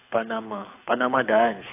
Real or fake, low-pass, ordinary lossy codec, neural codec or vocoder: fake; 3.6 kHz; AAC, 32 kbps; codec, 24 kHz, 6 kbps, HILCodec